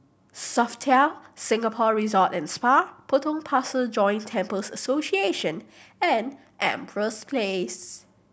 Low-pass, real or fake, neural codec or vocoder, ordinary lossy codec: none; real; none; none